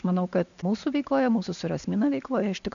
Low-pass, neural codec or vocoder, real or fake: 7.2 kHz; none; real